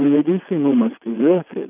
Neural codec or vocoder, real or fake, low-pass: vocoder, 22.05 kHz, 80 mel bands, WaveNeXt; fake; 3.6 kHz